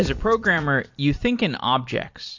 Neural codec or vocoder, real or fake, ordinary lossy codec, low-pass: vocoder, 44.1 kHz, 128 mel bands every 256 samples, BigVGAN v2; fake; MP3, 48 kbps; 7.2 kHz